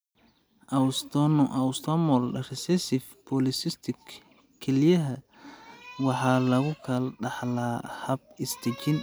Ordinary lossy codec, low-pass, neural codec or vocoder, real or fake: none; none; none; real